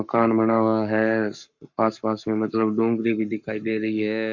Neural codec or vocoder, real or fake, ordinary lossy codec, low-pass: codec, 44.1 kHz, 7.8 kbps, Pupu-Codec; fake; none; 7.2 kHz